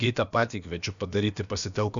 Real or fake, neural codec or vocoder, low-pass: fake; codec, 16 kHz, about 1 kbps, DyCAST, with the encoder's durations; 7.2 kHz